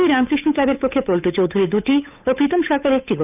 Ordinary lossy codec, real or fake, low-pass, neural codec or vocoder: none; fake; 3.6 kHz; codec, 16 kHz, 8 kbps, FunCodec, trained on Chinese and English, 25 frames a second